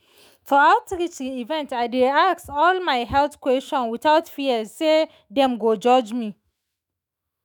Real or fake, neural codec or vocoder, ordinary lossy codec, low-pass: fake; autoencoder, 48 kHz, 128 numbers a frame, DAC-VAE, trained on Japanese speech; none; none